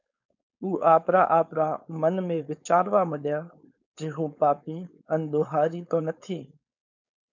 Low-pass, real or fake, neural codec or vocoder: 7.2 kHz; fake; codec, 16 kHz, 4.8 kbps, FACodec